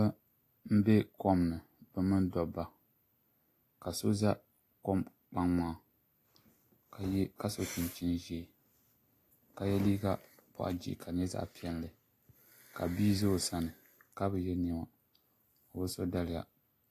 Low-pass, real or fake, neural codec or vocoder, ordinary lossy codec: 14.4 kHz; real; none; AAC, 48 kbps